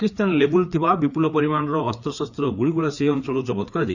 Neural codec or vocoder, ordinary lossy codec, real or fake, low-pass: codec, 16 kHz, 4 kbps, FreqCodec, larger model; none; fake; 7.2 kHz